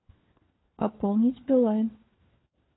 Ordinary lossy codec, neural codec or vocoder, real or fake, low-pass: AAC, 16 kbps; codec, 16 kHz, 4 kbps, FunCodec, trained on LibriTTS, 50 frames a second; fake; 7.2 kHz